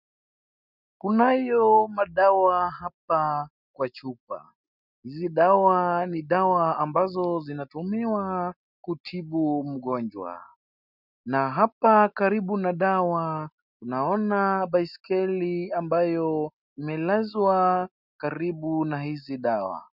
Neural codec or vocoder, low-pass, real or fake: none; 5.4 kHz; real